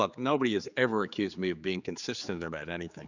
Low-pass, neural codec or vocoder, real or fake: 7.2 kHz; codec, 16 kHz, 4 kbps, X-Codec, HuBERT features, trained on general audio; fake